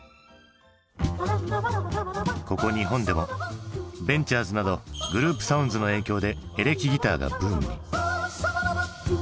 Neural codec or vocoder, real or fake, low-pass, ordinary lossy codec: none; real; none; none